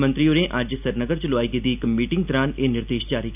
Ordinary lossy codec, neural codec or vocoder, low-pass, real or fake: none; none; 3.6 kHz; real